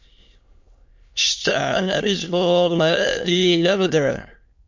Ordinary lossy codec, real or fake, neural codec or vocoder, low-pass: MP3, 48 kbps; fake; autoencoder, 22.05 kHz, a latent of 192 numbers a frame, VITS, trained on many speakers; 7.2 kHz